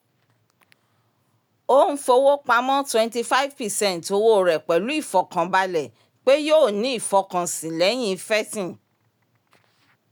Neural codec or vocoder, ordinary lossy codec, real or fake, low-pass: none; none; real; none